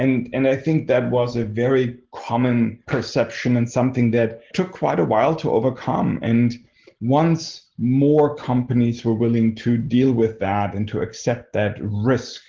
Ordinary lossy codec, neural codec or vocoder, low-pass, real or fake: Opus, 16 kbps; none; 7.2 kHz; real